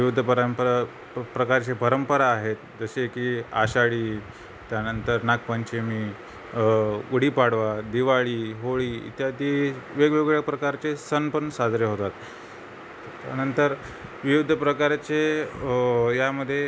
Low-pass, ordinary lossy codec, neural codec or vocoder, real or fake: none; none; none; real